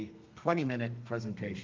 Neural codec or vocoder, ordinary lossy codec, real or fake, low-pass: codec, 32 kHz, 1.9 kbps, SNAC; Opus, 24 kbps; fake; 7.2 kHz